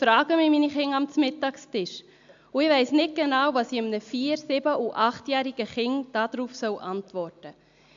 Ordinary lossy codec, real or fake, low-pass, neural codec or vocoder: MP3, 64 kbps; real; 7.2 kHz; none